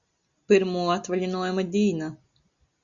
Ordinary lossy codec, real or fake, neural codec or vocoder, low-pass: Opus, 64 kbps; real; none; 7.2 kHz